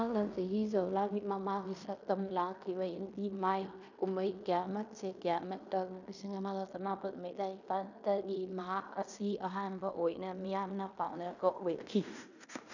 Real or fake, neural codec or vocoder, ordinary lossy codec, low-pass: fake; codec, 16 kHz in and 24 kHz out, 0.9 kbps, LongCat-Audio-Codec, fine tuned four codebook decoder; none; 7.2 kHz